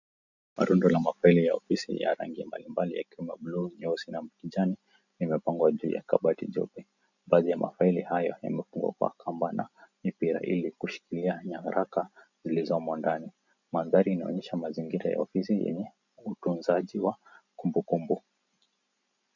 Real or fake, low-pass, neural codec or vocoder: real; 7.2 kHz; none